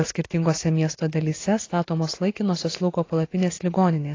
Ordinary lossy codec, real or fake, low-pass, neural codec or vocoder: AAC, 32 kbps; real; 7.2 kHz; none